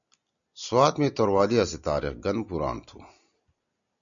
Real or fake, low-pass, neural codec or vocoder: real; 7.2 kHz; none